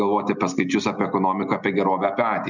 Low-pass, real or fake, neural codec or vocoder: 7.2 kHz; real; none